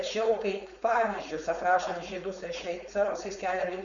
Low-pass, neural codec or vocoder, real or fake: 7.2 kHz; codec, 16 kHz, 4.8 kbps, FACodec; fake